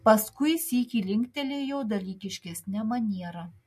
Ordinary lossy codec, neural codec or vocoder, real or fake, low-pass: MP3, 64 kbps; none; real; 14.4 kHz